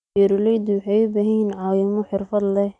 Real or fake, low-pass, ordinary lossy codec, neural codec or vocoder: real; 10.8 kHz; none; none